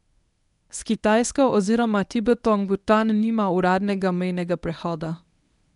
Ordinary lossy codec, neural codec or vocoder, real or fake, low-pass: none; codec, 24 kHz, 0.9 kbps, WavTokenizer, medium speech release version 1; fake; 10.8 kHz